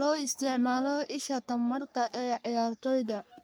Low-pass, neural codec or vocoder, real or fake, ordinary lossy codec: none; codec, 44.1 kHz, 3.4 kbps, Pupu-Codec; fake; none